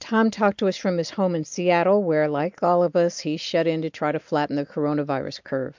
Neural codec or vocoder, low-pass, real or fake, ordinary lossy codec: none; 7.2 kHz; real; MP3, 64 kbps